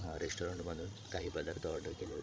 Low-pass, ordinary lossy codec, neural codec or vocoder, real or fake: none; none; codec, 16 kHz, 16 kbps, FreqCodec, larger model; fake